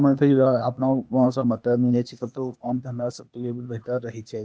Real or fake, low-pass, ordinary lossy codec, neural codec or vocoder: fake; none; none; codec, 16 kHz, 0.8 kbps, ZipCodec